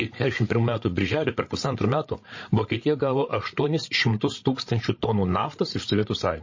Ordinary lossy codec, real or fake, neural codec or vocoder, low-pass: MP3, 32 kbps; fake; codec, 16 kHz, 16 kbps, FreqCodec, larger model; 7.2 kHz